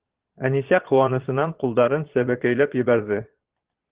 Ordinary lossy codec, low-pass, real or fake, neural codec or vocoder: Opus, 16 kbps; 3.6 kHz; fake; vocoder, 22.05 kHz, 80 mel bands, Vocos